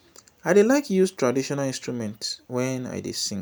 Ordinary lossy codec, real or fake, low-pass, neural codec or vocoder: none; real; none; none